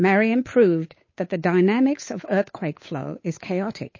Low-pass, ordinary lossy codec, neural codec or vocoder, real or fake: 7.2 kHz; MP3, 32 kbps; codec, 16 kHz, 8 kbps, FunCodec, trained on Chinese and English, 25 frames a second; fake